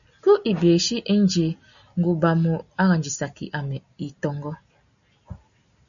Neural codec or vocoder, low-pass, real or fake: none; 7.2 kHz; real